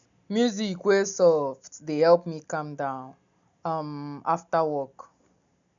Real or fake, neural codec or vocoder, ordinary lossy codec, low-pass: real; none; none; 7.2 kHz